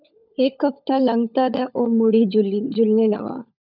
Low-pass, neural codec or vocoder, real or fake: 5.4 kHz; codec, 16 kHz, 16 kbps, FunCodec, trained on LibriTTS, 50 frames a second; fake